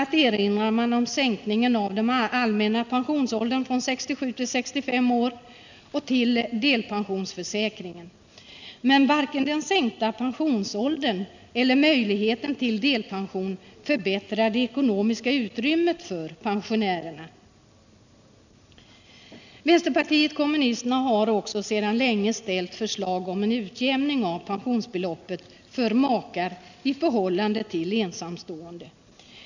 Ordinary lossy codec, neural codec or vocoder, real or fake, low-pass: none; none; real; 7.2 kHz